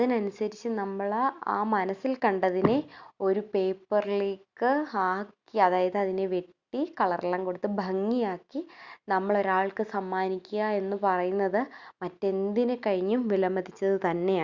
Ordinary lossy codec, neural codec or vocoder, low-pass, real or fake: Opus, 64 kbps; none; 7.2 kHz; real